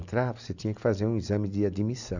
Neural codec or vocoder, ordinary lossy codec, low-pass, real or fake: none; none; 7.2 kHz; real